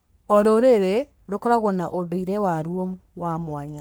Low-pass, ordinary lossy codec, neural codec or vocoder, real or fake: none; none; codec, 44.1 kHz, 3.4 kbps, Pupu-Codec; fake